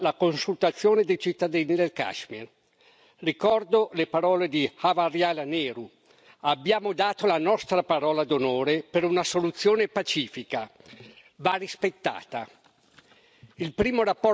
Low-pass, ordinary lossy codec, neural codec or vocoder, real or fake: none; none; none; real